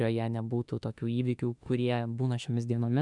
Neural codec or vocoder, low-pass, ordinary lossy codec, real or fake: autoencoder, 48 kHz, 32 numbers a frame, DAC-VAE, trained on Japanese speech; 10.8 kHz; MP3, 96 kbps; fake